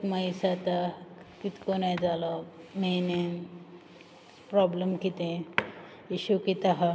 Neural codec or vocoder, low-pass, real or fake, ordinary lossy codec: none; none; real; none